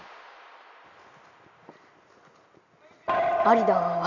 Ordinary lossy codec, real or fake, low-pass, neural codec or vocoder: none; real; 7.2 kHz; none